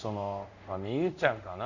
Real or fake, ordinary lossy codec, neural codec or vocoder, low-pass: fake; none; codec, 24 kHz, 0.5 kbps, DualCodec; 7.2 kHz